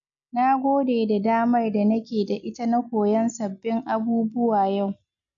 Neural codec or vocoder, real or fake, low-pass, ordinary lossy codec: none; real; 7.2 kHz; none